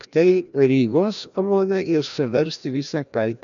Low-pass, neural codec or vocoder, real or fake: 7.2 kHz; codec, 16 kHz, 1 kbps, FreqCodec, larger model; fake